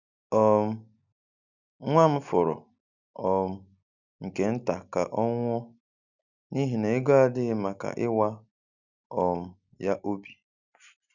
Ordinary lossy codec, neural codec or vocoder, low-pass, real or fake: none; none; 7.2 kHz; real